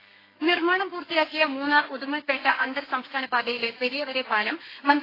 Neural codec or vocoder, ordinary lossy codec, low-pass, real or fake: codec, 32 kHz, 1.9 kbps, SNAC; AAC, 24 kbps; 5.4 kHz; fake